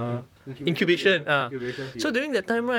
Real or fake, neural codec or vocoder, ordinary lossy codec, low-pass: fake; vocoder, 44.1 kHz, 128 mel bands, Pupu-Vocoder; none; 19.8 kHz